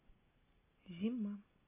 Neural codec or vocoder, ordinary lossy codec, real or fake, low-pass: none; MP3, 16 kbps; real; 3.6 kHz